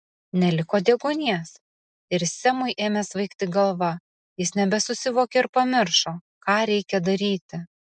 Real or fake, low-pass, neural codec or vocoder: real; 9.9 kHz; none